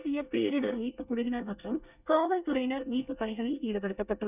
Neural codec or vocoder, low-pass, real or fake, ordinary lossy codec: codec, 24 kHz, 1 kbps, SNAC; 3.6 kHz; fake; none